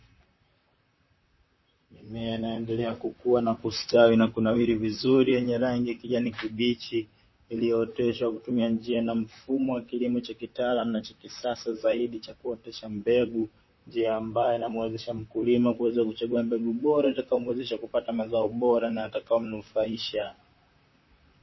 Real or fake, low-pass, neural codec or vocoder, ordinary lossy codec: fake; 7.2 kHz; vocoder, 44.1 kHz, 128 mel bands, Pupu-Vocoder; MP3, 24 kbps